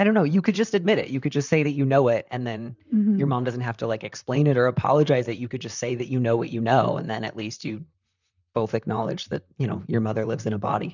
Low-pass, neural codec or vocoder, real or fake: 7.2 kHz; vocoder, 44.1 kHz, 128 mel bands, Pupu-Vocoder; fake